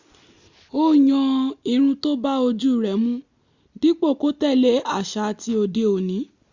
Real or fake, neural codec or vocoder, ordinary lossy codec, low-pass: real; none; Opus, 64 kbps; 7.2 kHz